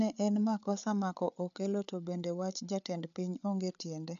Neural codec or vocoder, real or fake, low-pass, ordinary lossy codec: codec, 16 kHz, 8 kbps, FreqCodec, larger model; fake; 7.2 kHz; none